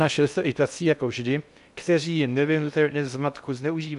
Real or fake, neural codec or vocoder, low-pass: fake; codec, 16 kHz in and 24 kHz out, 0.6 kbps, FocalCodec, streaming, 2048 codes; 10.8 kHz